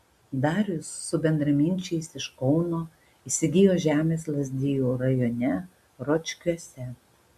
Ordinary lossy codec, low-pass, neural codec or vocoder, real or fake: MP3, 96 kbps; 14.4 kHz; none; real